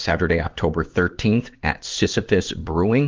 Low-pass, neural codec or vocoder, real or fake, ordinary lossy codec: 7.2 kHz; codec, 16 kHz in and 24 kHz out, 1 kbps, XY-Tokenizer; fake; Opus, 32 kbps